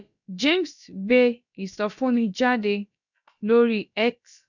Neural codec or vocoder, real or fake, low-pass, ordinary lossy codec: codec, 16 kHz, about 1 kbps, DyCAST, with the encoder's durations; fake; 7.2 kHz; none